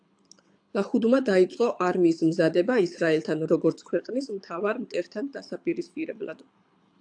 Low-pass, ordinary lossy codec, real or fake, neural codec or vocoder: 9.9 kHz; AAC, 64 kbps; fake; codec, 24 kHz, 6 kbps, HILCodec